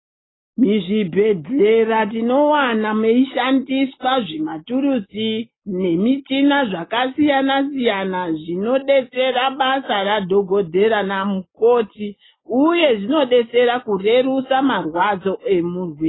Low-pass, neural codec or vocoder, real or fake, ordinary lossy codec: 7.2 kHz; none; real; AAC, 16 kbps